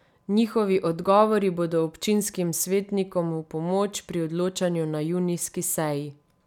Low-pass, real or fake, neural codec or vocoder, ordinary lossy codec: 19.8 kHz; real; none; none